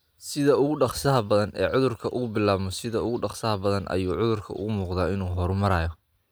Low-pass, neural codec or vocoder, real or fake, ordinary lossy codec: none; none; real; none